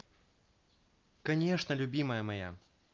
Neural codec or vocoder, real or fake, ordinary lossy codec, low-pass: none; real; Opus, 32 kbps; 7.2 kHz